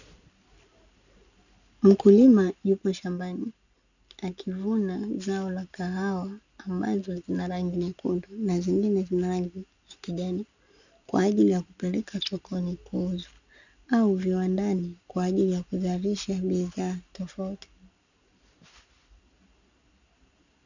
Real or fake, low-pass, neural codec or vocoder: real; 7.2 kHz; none